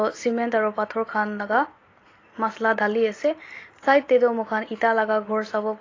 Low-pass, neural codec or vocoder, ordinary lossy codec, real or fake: 7.2 kHz; none; AAC, 32 kbps; real